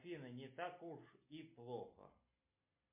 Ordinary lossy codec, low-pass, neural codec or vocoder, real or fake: MP3, 32 kbps; 3.6 kHz; none; real